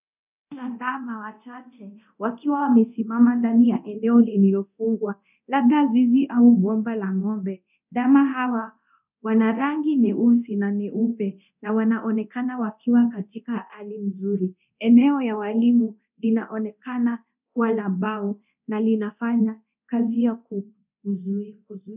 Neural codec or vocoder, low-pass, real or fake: codec, 24 kHz, 0.9 kbps, DualCodec; 3.6 kHz; fake